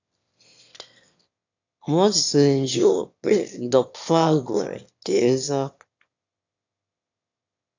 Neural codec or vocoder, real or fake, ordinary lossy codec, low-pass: autoencoder, 22.05 kHz, a latent of 192 numbers a frame, VITS, trained on one speaker; fake; AAC, 48 kbps; 7.2 kHz